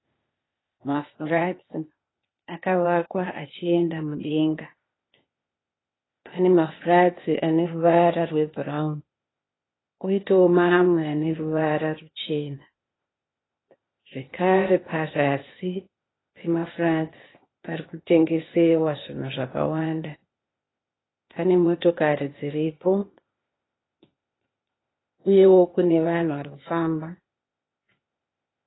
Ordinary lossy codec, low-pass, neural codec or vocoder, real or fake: AAC, 16 kbps; 7.2 kHz; codec, 16 kHz, 0.8 kbps, ZipCodec; fake